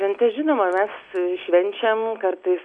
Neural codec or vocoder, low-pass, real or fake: none; 9.9 kHz; real